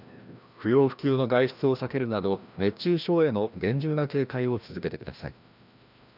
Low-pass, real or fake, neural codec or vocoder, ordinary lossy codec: 5.4 kHz; fake; codec, 16 kHz, 1 kbps, FreqCodec, larger model; none